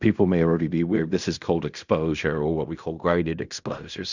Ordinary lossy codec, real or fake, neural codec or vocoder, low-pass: Opus, 64 kbps; fake; codec, 16 kHz in and 24 kHz out, 0.4 kbps, LongCat-Audio-Codec, fine tuned four codebook decoder; 7.2 kHz